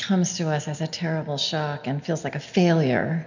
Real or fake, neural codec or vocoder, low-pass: real; none; 7.2 kHz